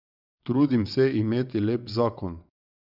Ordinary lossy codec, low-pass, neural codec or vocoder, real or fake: none; 5.4 kHz; vocoder, 22.05 kHz, 80 mel bands, WaveNeXt; fake